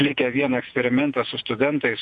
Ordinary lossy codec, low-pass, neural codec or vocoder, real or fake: MP3, 64 kbps; 10.8 kHz; vocoder, 48 kHz, 128 mel bands, Vocos; fake